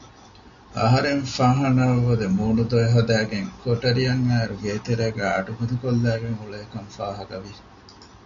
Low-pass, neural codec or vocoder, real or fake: 7.2 kHz; none; real